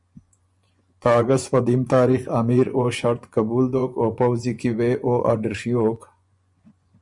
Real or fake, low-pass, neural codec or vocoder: fake; 10.8 kHz; vocoder, 24 kHz, 100 mel bands, Vocos